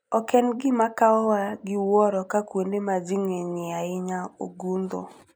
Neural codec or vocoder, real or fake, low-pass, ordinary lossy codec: none; real; none; none